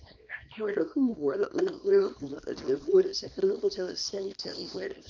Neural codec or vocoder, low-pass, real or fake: codec, 24 kHz, 0.9 kbps, WavTokenizer, small release; 7.2 kHz; fake